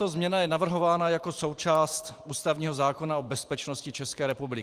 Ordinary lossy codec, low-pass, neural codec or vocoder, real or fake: Opus, 24 kbps; 14.4 kHz; vocoder, 44.1 kHz, 128 mel bands every 512 samples, BigVGAN v2; fake